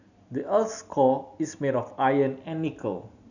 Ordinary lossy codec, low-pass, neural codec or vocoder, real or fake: none; 7.2 kHz; none; real